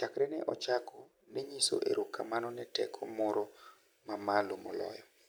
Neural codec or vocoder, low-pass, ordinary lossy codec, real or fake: none; none; none; real